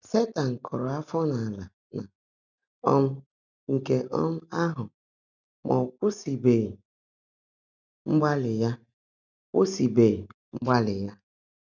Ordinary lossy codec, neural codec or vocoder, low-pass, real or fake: none; none; 7.2 kHz; real